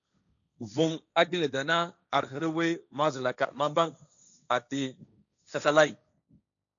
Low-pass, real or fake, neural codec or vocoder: 7.2 kHz; fake; codec, 16 kHz, 1.1 kbps, Voila-Tokenizer